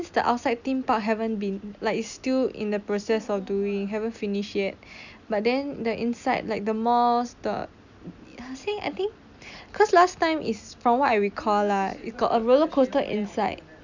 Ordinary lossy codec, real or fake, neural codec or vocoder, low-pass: none; real; none; 7.2 kHz